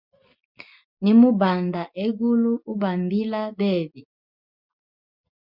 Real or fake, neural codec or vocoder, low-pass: real; none; 5.4 kHz